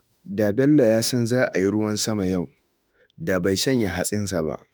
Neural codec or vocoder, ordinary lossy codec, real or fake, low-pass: autoencoder, 48 kHz, 32 numbers a frame, DAC-VAE, trained on Japanese speech; none; fake; none